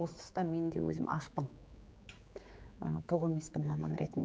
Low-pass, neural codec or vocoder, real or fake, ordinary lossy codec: none; codec, 16 kHz, 4 kbps, X-Codec, HuBERT features, trained on general audio; fake; none